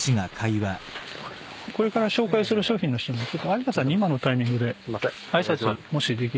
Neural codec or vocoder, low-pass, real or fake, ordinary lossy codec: none; none; real; none